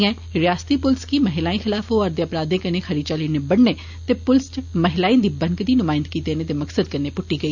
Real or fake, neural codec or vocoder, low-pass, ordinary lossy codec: real; none; 7.2 kHz; none